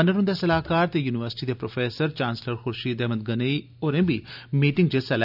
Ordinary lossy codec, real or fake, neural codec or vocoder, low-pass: none; real; none; 5.4 kHz